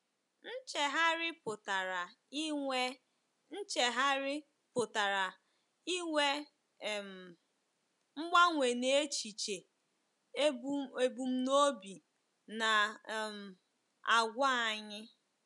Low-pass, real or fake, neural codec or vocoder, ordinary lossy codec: 10.8 kHz; real; none; none